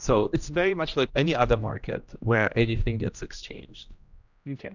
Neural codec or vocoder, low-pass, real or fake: codec, 16 kHz, 1 kbps, X-Codec, HuBERT features, trained on general audio; 7.2 kHz; fake